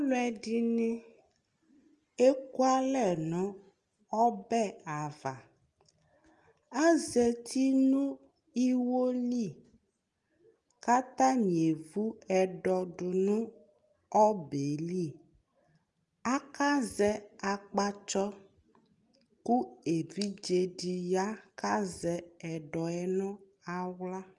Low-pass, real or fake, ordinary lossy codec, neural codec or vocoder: 10.8 kHz; real; Opus, 32 kbps; none